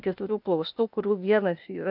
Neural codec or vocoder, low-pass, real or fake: codec, 16 kHz, 0.8 kbps, ZipCodec; 5.4 kHz; fake